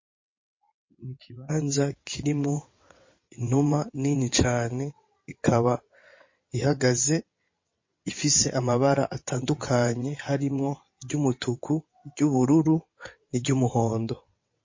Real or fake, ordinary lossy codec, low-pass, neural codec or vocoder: fake; MP3, 32 kbps; 7.2 kHz; vocoder, 22.05 kHz, 80 mel bands, WaveNeXt